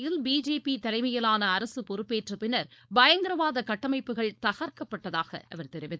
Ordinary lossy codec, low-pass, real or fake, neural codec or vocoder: none; none; fake; codec, 16 kHz, 4.8 kbps, FACodec